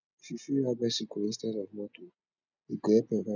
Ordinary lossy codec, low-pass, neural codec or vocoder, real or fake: none; 7.2 kHz; none; real